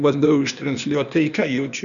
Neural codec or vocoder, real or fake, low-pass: codec, 16 kHz, 0.8 kbps, ZipCodec; fake; 7.2 kHz